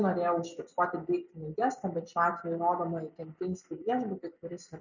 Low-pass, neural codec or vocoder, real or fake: 7.2 kHz; none; real